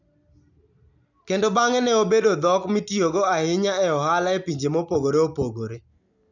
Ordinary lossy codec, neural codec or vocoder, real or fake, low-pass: none; none; real; 7.2 kHz